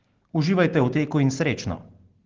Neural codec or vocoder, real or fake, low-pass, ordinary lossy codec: none; real; 7.2 kHz; Opus, 16 kbps